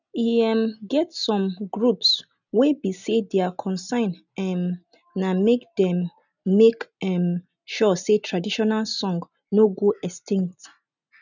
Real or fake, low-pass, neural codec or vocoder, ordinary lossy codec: real; 7.2 kHz; none; none